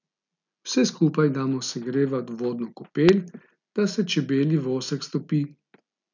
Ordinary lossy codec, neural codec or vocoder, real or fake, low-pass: none; none; real; 7.2 kHz